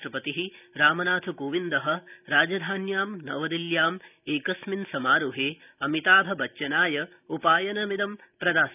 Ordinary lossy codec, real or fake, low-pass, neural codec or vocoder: none; real; 3.6 kHz; none